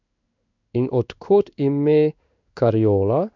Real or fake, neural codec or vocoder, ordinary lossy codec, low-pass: fake; codec, 16 kHz in and 24 kHz out, 1 kbps, XY-Tokenizer; none; 7.2 kHz